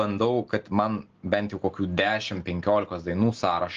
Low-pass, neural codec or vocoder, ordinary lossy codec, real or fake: 7.2 kHz; none; Opus, 16 kbps; real